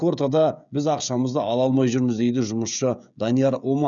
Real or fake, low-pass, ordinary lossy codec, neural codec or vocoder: fake; 7.2 kHz; MP3, 96 kbps; codec, 16 kHz, 16 kbps, FreqCodec, smaller model